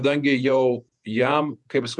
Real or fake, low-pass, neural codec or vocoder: fake; 10.8 kHz; vocoder, 48 kHz, 128 mel bands, Vocos